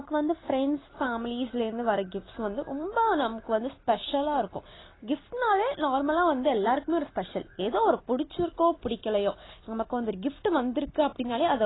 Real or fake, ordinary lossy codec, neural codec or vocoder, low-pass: real; AAC, 16 kbps; none; 7.2 kHz